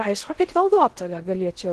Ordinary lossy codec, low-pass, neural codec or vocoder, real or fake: Opus, 16 kbps; 10.8 kHz; codec, 16 kHz in and 24 kHz out, 0.6 kbps, FocalCodec, streaming, 4096 codes; fake